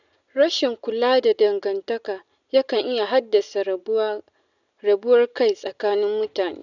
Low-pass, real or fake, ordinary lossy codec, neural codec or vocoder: 7.2 kHz; real; none; none